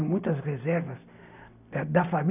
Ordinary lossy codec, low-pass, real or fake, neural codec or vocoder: none; 3.6 kHz; real; none